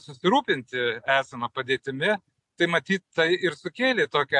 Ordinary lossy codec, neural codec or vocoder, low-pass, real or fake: MP3, 64 kbps; none; 10.8 kHz; real